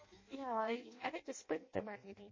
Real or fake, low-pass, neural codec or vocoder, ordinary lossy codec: fake; 7.2 kHz; codec, 16 kHz in and 24 kHz out, 0.6 kbps, FireRedTTS-2 codec; MP3, 32 kbps